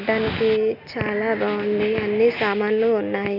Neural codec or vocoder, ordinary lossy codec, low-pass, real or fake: none; none; 5.4 kHz; real